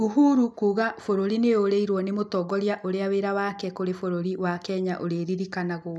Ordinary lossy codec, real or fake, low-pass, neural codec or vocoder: none; real; none; none